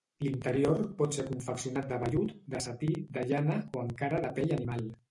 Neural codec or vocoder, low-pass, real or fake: none; 10.8 kHz; real